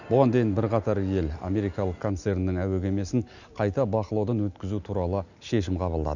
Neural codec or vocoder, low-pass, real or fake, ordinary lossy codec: none; 7.2 kHz; real; none